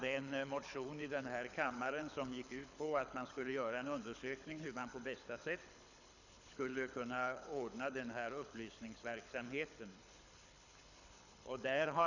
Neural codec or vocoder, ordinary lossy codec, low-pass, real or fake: codec, 24 kHz, 6 kbps, HILCodec; none; 7.2 kHz; fake